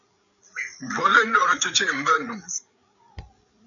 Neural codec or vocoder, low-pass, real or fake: codec, 16 kHz, 8 kbps, FreqCodec, larger model; 7.2 kHz; fake